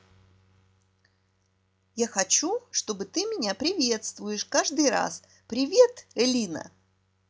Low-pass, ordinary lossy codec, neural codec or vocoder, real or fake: none; none; none; real